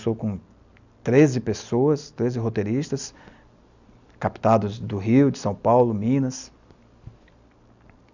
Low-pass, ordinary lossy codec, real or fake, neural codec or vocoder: 7.2 kHz; none; real; none